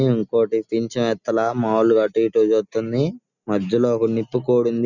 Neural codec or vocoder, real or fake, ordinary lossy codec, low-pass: none; real; none; 7.2 kHz